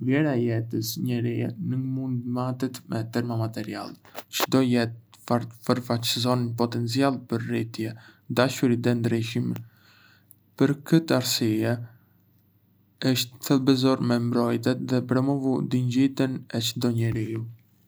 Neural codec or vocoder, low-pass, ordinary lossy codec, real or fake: none; none; none; real